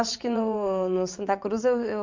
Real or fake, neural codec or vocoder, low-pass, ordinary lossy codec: fake; vocoder, 22.05 kHz, 80 mel bands, WaveNeXt; 7.2 kHz; MP3, 48 kbps